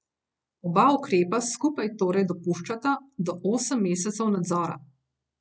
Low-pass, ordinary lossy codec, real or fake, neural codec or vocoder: none; none; real; none